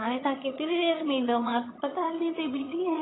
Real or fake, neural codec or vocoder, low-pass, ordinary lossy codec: fake; vocoder, 22.05 kHz, 80 mel bands, HiFi-GAN; 7.2 kHz; AAC, 16 kbps